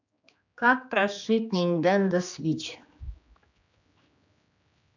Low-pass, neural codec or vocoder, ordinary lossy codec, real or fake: 7.2 kHz; codec, 16 kHz, 2 kbps, X-Codec, HuBERT features, trained on general audio; none; fake